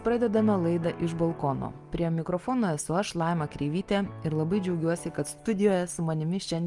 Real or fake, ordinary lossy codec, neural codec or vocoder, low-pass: real; Opus, 32 kbps; none; 10.8 kHz